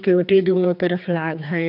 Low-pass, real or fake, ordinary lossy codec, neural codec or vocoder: 5.4 kHz; fake; none; codec, 16 kHz, 2 kbps, X-Codec, HuBERT features, trained on general audio